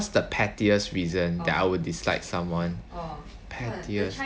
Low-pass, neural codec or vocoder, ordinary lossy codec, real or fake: none; none; none; real